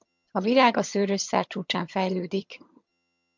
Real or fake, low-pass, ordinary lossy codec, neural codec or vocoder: fake; 7.2 kHz; MP3, 64 kbps; vocoder, 22.05 kHz, 80 mel bands, HiFi-GAN